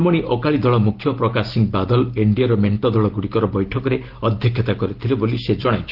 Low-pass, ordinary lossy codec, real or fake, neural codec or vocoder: 5.4 kHz; Opus, 16 kbps; real; none